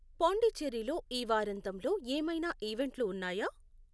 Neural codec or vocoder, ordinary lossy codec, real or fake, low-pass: none; none; real; none